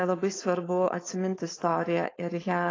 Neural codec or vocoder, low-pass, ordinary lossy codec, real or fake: codec, 16 kHz, 4.8 kbps, FACodec; 7.2 kHz; AAC, 32 kbps; fake